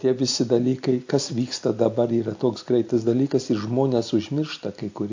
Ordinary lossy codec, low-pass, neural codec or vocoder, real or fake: AAC, 48 kbps; 7.2 kHz; none; real